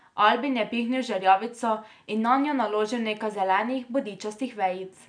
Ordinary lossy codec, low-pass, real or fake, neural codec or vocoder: none; 9.9 kHz; real; none